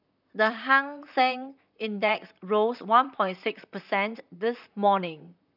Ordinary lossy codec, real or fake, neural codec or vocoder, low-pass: none; fake; vocoder, 44.1 kHz, 128 mel bands, Pupu-Vocoder; 5.4 kHz